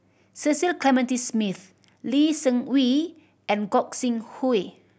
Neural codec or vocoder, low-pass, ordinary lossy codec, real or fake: none; none; none; real